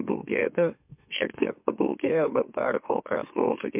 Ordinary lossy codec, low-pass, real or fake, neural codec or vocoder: MP3, 32 kbps; 3.6 kHz; fake; autoencoder, 44.1 kHz, a latent of 192 numbers a frame, MeloTTS